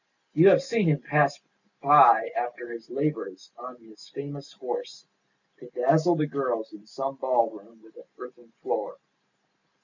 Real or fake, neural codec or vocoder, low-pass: real; none; 7.2 kHz